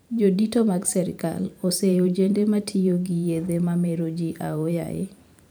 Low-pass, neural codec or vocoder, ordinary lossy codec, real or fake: none; vocoder, 44.1 kHz, 128 mel bands every 512 samples, BigVGAN v2; none; fake